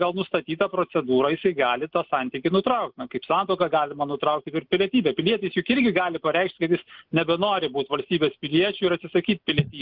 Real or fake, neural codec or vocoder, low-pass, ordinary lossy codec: real; none; 5.4 kHz; Opus, 24 kbps